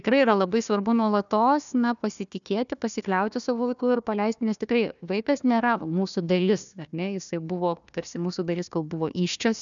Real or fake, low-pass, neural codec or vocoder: fake; 7.2 kHz; codec, 16 kHz, 1 kbps, FunCodec, trained on Chinese and English, 50 frames a second